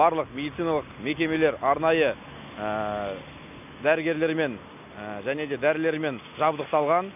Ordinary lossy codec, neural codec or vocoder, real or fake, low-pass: none; none; real; 3.6 kHz